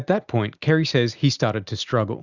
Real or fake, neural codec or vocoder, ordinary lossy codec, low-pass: real; none; Opus, 64 kbps; 7.2 kHz